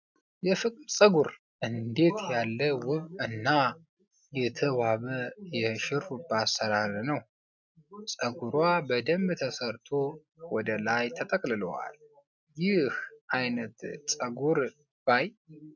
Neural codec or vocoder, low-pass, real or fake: none; 7.2 kHz; real